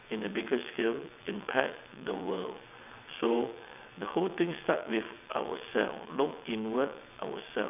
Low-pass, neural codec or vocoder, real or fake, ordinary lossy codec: 3.6 kHz; vocoder, 22.05 kHz, 80 mel bands, WaveNeXt; fake; none